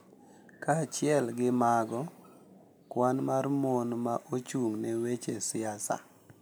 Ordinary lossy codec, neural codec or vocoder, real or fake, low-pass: none; none; real; none